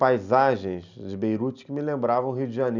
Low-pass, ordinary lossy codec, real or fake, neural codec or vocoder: 7.2 kHz; none; real; none